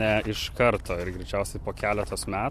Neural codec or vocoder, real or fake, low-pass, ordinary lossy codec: none; real; 14.4 kHz; MP3, 64 kbps